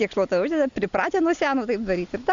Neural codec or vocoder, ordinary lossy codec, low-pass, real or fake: none; Opus, 64 kbps; 7.2 kHz; real